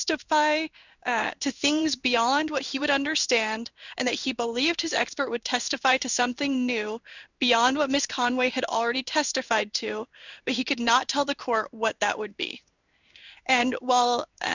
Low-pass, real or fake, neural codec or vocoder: 7.2 kHz; fake; codec, 16 kHz in and 24 kHz out, 1 kbps, XY-Tokenizer